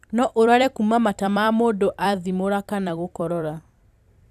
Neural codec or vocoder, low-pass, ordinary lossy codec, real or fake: vocoder, 44.1 kHz, 128 mel bands every 256 samples, BigVGAN v2; 14.4 kHz; none; fake